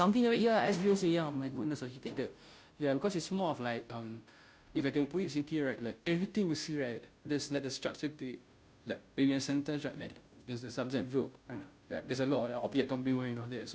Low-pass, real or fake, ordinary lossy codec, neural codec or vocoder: none; fake; none; codec, 16 kHz, 0.5 kbps, FunCodec, trained on Chinese and English, 25 frames a second